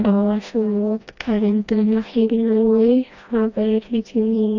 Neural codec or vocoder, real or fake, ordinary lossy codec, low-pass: codec, 16 kHz, 1 kbps, FreqCodec, smaller model; fake; none; 7.2 kHz